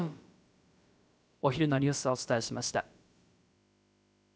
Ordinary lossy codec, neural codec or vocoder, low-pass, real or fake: none; codec, 16 kHz, about 1 kbps, DyCAST, with the encoder's durations; none; fake